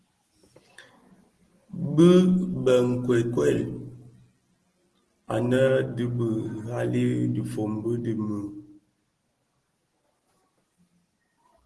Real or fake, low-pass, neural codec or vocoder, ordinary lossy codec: real; 10.8 kHz; none; Opus, 16 kbps